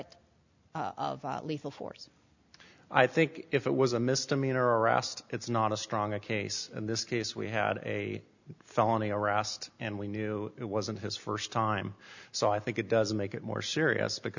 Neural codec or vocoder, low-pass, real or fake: none; 7.2 kHz; real